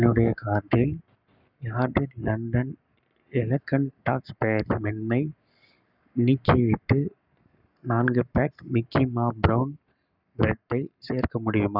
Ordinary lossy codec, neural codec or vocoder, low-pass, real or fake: none; codec, 44.1 kHz, 7.8 kbps, Pupu-Codec; 5.4 kHz; fake